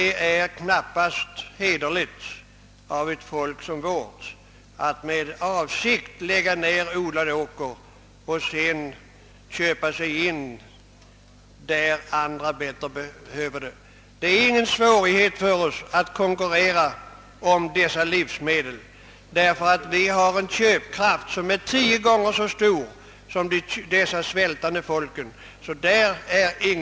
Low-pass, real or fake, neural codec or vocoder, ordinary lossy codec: none; real; none; none